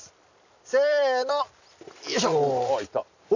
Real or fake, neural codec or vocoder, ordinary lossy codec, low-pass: real; none; none; 7.2 kHz